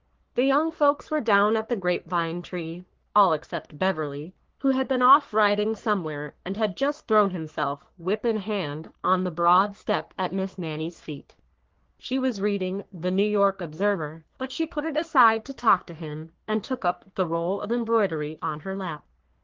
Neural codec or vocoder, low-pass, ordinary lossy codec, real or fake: codec, 44.1 kHz, 3.4 kbps, Pupu-Codec; 7.2 kHz; Opus, 16 kbps; fake